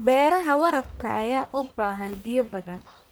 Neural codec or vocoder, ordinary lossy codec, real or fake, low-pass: codec, 44.1 kHz, 1.7 kbps, Pupu-Codec; none; fake; none